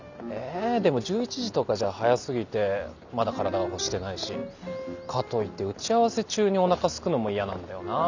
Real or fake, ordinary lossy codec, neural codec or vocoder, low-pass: real; none; none; 7.2 kHz